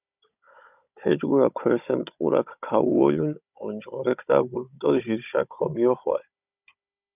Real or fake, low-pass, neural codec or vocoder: fake; 3.6 kHz; codec, 16 kHz, 16 kbps, FunCodec, trained on Chinese and English, 50 frames a second